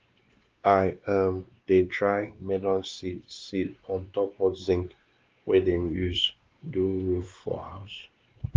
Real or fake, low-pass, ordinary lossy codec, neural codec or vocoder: fake; 7.2 kHz; Opus, 24 kbps; codec, 16 kHz, 2 kbps, X-Codec, WavLM features, trained on Multilingual LibriSpeech